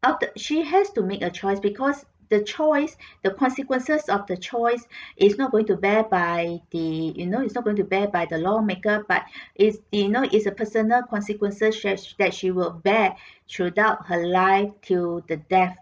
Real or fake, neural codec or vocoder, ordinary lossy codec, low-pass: real; none; none; none